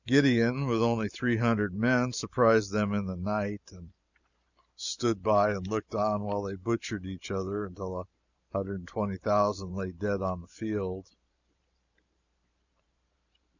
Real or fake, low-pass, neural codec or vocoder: fake; 7.2 kHz; vocoder, 44.1 kHz, 128 mel bands every 512 samples, BigVGAN v2